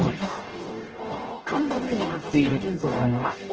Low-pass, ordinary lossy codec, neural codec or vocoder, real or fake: 7.2 kHz; Opus, 16 kbps; codec, 44.1 kHz, 0.9 kbps, DAC; fake